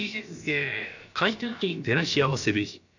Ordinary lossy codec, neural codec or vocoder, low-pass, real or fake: none; codec, 16 kHz, about 1 kbps, DyCAST, with the encoder's durations; 7.2 kHz; fake